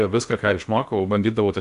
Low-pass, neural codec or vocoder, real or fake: 10.8 kHz; codec, 16 kHz in and 24 kHz out, 0.8 kbps, FocalCodec, streaming, 65536 codes; fake